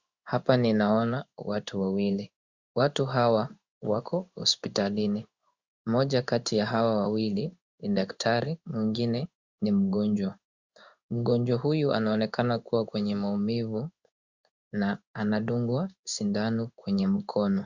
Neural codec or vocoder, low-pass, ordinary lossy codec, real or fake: codec, 16 kHz in and 24 kHz out, 1 kbps, XY-Tokenizer; 7.2 kHz; Opus, 64 kbps; fake